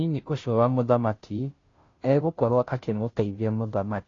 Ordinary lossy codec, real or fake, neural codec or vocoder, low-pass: AAC, 32 kbps; fake; codec, 16 kHz, 0.5 kbps, FunCodec, trained on Chinese and English, 25 frames a second; 7.2 kHz